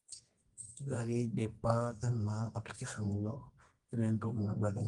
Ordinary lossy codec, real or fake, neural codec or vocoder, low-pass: Opus, 24 kbps; fake; codec, 24 kHz, 0.9 kbps, WavTokenizer, medium music audio release; 10.8 kHz